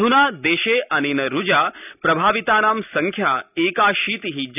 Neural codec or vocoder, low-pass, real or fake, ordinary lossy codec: none; 3.6 kHz; real; none